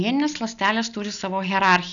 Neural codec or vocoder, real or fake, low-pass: none; real; 7.2 kHz